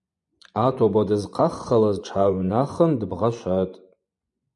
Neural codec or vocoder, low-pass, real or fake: vocoder, 24 kHz, 100 mel bands, Vocos; 10.8 kHz; fake